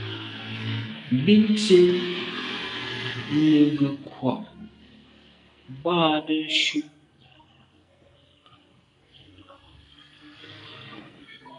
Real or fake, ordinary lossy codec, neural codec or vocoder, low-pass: fake; MP3, 64 kbps; codec, 44.1 kHz, 2.6 kbps, SNAC; 10.8 kHz